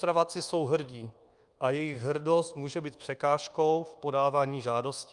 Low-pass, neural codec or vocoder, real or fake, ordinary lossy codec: 10.8 kHz; codec, 24 kHz, 1.2 kbps, DualCodec; fake; Opus, 32 kbps